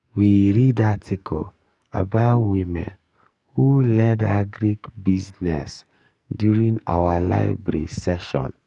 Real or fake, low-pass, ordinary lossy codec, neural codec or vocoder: fake; 10.8 kHz; none; codec, 44.1 kHz, 2.6 kbps, SNAC